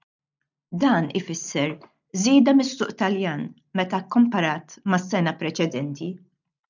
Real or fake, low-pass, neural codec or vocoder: real; 7.2 kHz; none